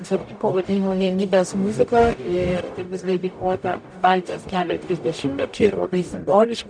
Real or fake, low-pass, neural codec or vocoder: fake; 9.9 kHz; codec, 44.1 kHz, 0.9 kbps, DAC